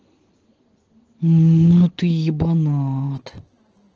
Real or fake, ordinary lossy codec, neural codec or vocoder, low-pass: real; Opus, 16 kbps; none; 7.2 kHz